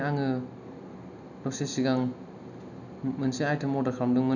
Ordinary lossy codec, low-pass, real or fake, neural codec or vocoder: none; 7.2 kHz; real; none